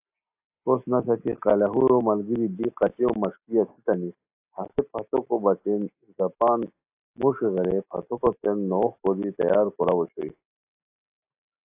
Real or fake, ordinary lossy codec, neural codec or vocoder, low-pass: real; AAC, 32 kbps; none; 3.6 kHz